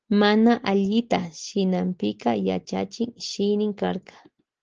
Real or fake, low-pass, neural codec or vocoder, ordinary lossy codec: real; 7.2 kHz; none; Opus, 16 kbps